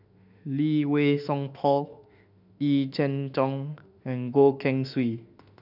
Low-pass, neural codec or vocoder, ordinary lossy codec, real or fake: 5.4 kHz; autoencoder, 48 kHz, 32 numbers a frame, DAC-VAE, trained on Japanese speech; none; fake